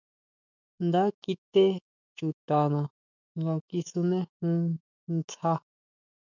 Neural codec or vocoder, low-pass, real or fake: autoencoder, 48 kHz, 128 numbers a frame, DAC-VAE, trained on Japanese speech; 7.2 kHz; fake